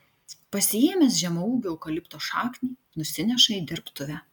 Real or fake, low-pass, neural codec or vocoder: real; 19.8 kHz; none